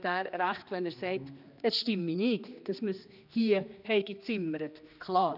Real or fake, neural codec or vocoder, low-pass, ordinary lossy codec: fake; codec, 16 kHz, 2 kbps, X-Codec, HuBERT features, trained on general audio; 5.4 kHz; none